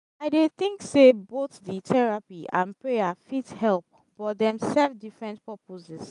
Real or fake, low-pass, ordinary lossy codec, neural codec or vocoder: real; 10.8 kHz; none; none